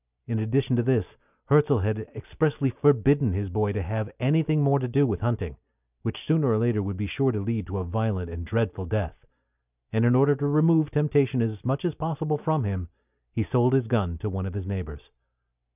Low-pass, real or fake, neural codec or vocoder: 3.6 kHz; real; none